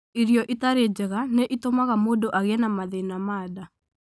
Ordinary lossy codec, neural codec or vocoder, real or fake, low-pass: none; none; real; none